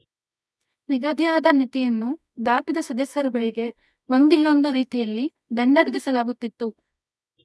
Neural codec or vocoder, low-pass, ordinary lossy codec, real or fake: codec, 24 kHz, 0.9 kbps, WavTokenizer, medium music audio release; none; none; fake